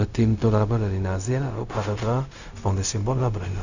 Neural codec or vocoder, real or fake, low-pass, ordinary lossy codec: codec, 16 kHz, 0.4 kbps, LongCat-Audio-Codec; fake; 7.2 kHz; none